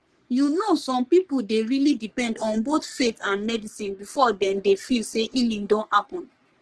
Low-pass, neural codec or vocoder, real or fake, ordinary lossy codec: 10.8 kHz; codec, 44.1 kHz, 3.4 kbps, Pupu-Codec; fake; Opus, 16 kbps